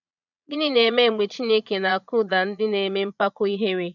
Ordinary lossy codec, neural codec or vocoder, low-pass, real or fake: none; vocoder, 22.05 kHz, 80 mel bands, Vocos; 7.2 kHz; fake